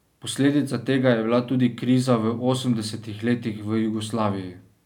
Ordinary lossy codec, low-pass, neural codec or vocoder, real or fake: none; 19.8 kHz; none; real